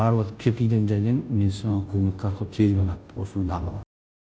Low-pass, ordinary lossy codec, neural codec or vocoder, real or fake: none; none; codec, 16 kHz, 0.5 kbps, FunCodec, trained on Chinese and English, 25 frames a second; fake